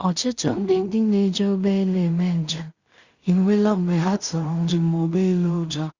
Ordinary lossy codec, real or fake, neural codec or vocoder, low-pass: Opus, 64 kbps; fake; codec, 16 kHz in and 24 kHz out, 0.4 kbps, LongCat-Audio-Codec, two codebook decoder; 7.2 kHz